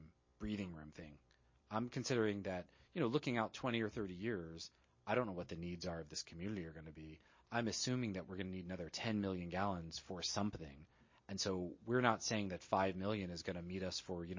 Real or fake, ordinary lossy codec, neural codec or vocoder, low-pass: real; MP3, 32 kbps; none; 7.2 kHz